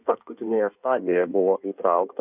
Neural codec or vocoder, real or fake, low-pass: codec, 16 kHz in and 24 kHz out, 1.1 kbps, FireRedTTS-2 codec; fake; 3.6 kHz